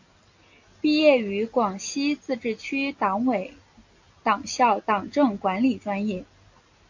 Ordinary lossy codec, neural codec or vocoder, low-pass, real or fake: AAC, 48 kbps; none; 7.2 kHz; real